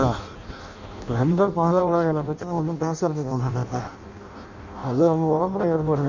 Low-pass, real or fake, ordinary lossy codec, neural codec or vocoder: 7.2 kHz; fake; none; codec, 16 kHz in and 24 kHz out, 0.6 kbps, FireRedTTS-2 codec